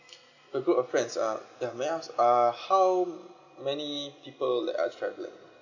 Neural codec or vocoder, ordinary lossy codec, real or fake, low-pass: none; none; real; 7.2 kHz